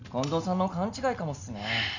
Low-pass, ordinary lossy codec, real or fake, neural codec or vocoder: 7.2 kHz; none; real; none